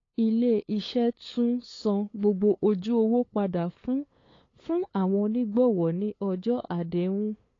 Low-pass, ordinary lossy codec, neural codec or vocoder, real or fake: 7.2 kHz; AAC, 32 kbps; codec, 16 kHz, 8 kbps, FunCodec, trained on LibriTTS, 25 frames a second; fake